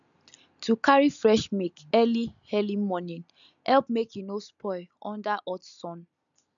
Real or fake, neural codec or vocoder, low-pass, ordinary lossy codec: real; none; 7.2 kHz; none